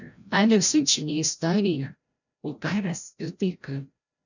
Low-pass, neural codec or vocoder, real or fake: 7.2 kHz; codec, 16 kHz, 0.5 kbps, FreqCodec, larger model; fake